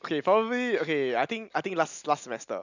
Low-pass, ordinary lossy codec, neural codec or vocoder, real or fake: 7.2 kHz; none; none; real